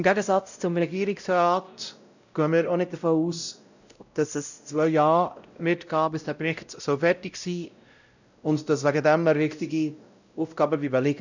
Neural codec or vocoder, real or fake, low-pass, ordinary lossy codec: codec, 16 kHz, 0.5 kbps, X-Codec, WavLM features, trained on Multilingual LibriSpeech; fake; 7.2 kHz; none